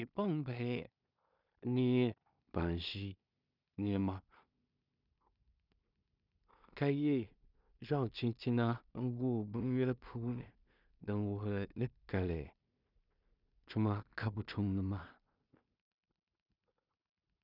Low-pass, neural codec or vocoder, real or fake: 5.4 kHz; codec, 16 kHz in and 24 kHz out, 0.4 kbps, LongCat-Audio-Codec, two codebook decoder; fake